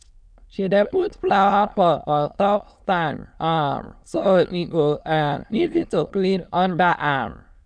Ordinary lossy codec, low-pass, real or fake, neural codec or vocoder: none; 9.9 kHz; fake; autoencoder, 22.05 kHz, a latent of 192 numbers a frame, VITS, trained on many speakers